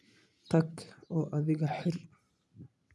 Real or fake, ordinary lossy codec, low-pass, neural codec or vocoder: real; none; none; none